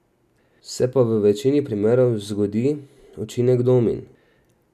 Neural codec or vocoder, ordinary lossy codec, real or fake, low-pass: none; none; real; 14.4 kHz